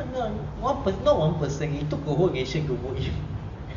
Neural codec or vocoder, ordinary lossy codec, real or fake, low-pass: none; none; real; 7.2 kHz